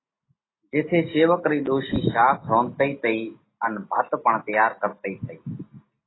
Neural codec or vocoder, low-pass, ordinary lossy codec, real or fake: none; 7.2 kHz; AAC, 16 kbps; real